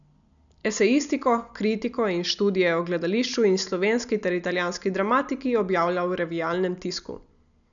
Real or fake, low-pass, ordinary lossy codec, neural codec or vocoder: real; 7.2 kHz; none; none